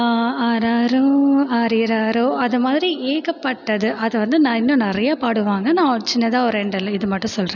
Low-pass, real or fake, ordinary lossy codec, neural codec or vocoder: 7.2 kHz; real; none; none